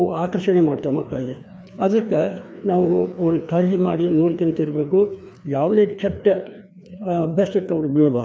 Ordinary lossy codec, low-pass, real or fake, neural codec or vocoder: none; none; fake; codec, 16 kHz, 2 kbps, FreqCodec, larger model